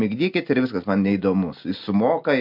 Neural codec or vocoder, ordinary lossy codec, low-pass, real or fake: none; MP3, 48 kbps; 5.4 kHz; real